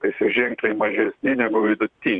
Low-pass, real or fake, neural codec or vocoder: 9.9 kHz; fake; vocoder, 44.1 kHz, 128 mel bands, Pupu-Vocoder